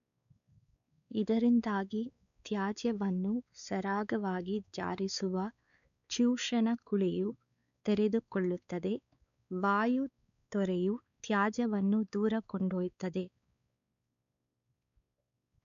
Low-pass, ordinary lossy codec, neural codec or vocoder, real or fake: 7.2 kHz; none; codec, 16 kHz, 4 kbps, X-Codec, WavLM features, trained on Multilingual LibriSpeech; fake